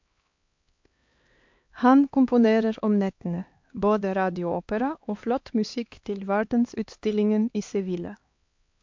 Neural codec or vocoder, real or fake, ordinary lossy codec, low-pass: codec, 16 kHz, 2 kbps, X-Codec, HuBERT features, trained on LibriSpeech; fake; MP3, 48 kbps; 7.2 kHz